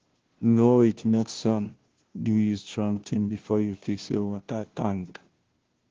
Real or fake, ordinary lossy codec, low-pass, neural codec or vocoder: fake; Opus, 16 kbps; 7.2 kHz; codec, 16 kHz, 0.5 kbps, FunCodec, trained on Chinese and English, 25 frames a second